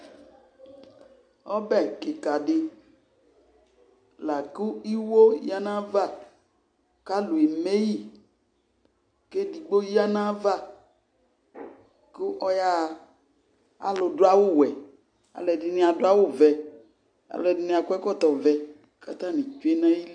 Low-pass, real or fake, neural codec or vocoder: 9.9 kHz; real; none